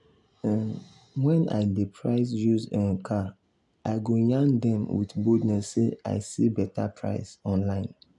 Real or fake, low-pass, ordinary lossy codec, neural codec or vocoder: real; 10.8 kHz; none; none